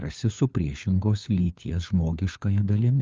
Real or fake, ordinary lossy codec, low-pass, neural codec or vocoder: fake; Opus, 32 kbps; 7.2 kHz; codec, 16 kHz, 4 kbps, FunCodec, trained on LibriTTS, 50 frames a second